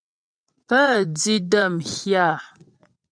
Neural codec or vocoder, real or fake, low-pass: vocoder, 22.05 kHz, 80 mel bands, WaveNeXt; fake; 9.9 kHz